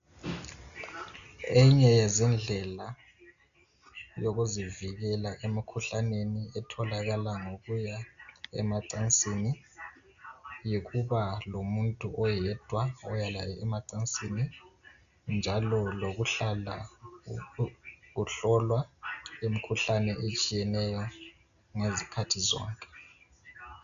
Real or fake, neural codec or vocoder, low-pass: real; none; 7.2 kHz